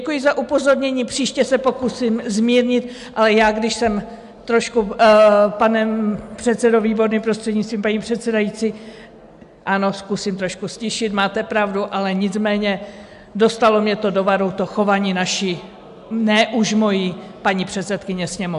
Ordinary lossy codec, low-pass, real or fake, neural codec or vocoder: AAC, 96 kbps; 10.8 kHz; real; none